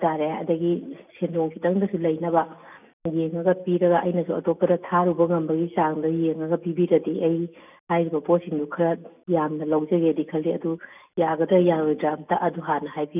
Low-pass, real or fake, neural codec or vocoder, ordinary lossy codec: 3.6 kHz; real; none; none